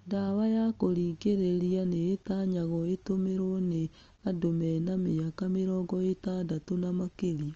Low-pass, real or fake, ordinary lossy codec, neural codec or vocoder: 7.2 kHz; real; Opus, 24 kbps; none